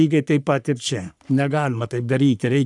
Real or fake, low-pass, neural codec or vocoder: fake; 10.8 kHz; codec, 44.1 kHz, 3.4 kbps, Pupu-Codec